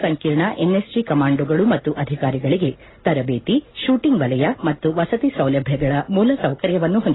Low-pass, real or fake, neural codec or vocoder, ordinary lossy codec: 7.2 kHz; real; none; AAC, 16 kbps